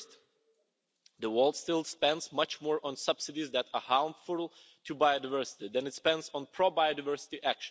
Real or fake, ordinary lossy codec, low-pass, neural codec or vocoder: real; none; none; none